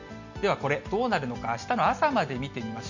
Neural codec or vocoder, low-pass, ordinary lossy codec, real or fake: none; 7.2 kHz; none; real